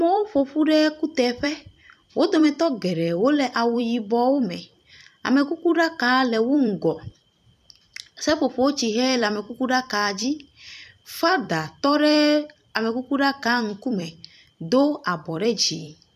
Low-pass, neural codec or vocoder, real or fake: 14.4 kHz; vocoder, 44.1 kHz, 128 mel bands every 256 samples, BigVGAN v2; fake